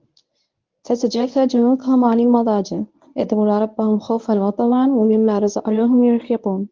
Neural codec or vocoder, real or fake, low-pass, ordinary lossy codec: codec, 24 kHz, 0.9 kbps, WavTokenizer, medium speech release version 1; fake; 7.2 kHz; Opus, 32 kbps